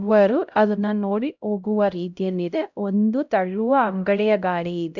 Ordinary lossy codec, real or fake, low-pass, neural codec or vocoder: none; fake; 7.2 kHz; codec, 16 kHz, 0.5 kbps, X-Codec, HuBERT features, trained on LibriSpeech